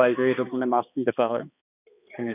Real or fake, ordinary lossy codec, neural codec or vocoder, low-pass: fake; none; codec, 16 kHz, 2 kbps, X-Codec, HuBERT features, trained on balanced general audio; 3.6 kHz